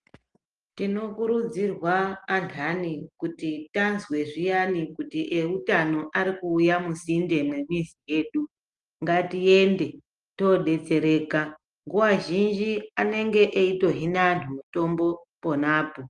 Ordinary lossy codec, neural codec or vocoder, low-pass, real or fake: Opus, 24 kbps; none; 10.8 kHz; real